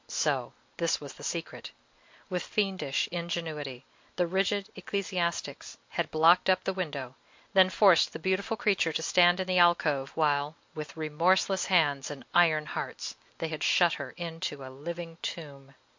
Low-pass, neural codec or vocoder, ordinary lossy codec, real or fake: 7.2 kHz; none; MP3, 48 kbps; real